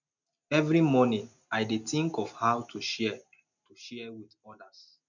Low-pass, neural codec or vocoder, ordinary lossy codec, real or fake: 7.2 kHz; none; none; real